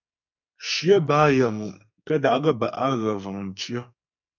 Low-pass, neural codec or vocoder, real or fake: 7.2 kHz; codec, 44.1 kHz, 2.6 kbps, SNAC; fake